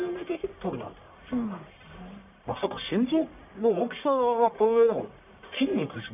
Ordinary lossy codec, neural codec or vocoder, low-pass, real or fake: none; codec, 44.1 kHz, 1.7 kbps, Pupu-Codec; 3.6 kHz; fake